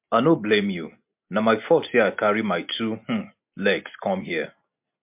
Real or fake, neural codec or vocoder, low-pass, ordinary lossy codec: real; none; 3.6 kHz; MP3, 32 kbps